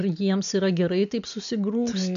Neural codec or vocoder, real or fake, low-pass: none; real; 7.2 kHz